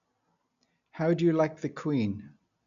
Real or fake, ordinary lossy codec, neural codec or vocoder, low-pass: real; none; none; 7.2 kHz